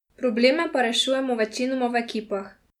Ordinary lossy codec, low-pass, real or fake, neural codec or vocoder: MP3, 96 kbps; 19.8 kHz; real; none